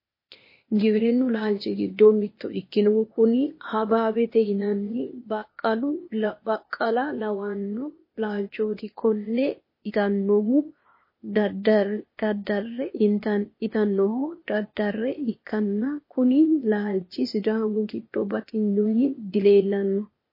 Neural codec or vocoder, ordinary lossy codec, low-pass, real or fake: codec, 16 kHz, 0.8 kbps, ZipCodec; MP3, 24 kbps; 5.4 kHz; fake